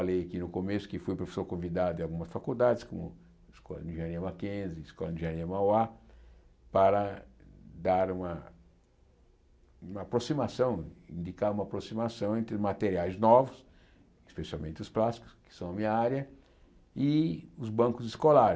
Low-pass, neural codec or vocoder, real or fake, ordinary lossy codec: none; none; real; none